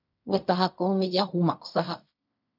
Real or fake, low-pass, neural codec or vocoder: fake; 5.4 kHz; codec, 16 kHz in and 24 kHz out, 0.4 kbps, LongCat-Audio-Codec, fine tuned four codebook decoder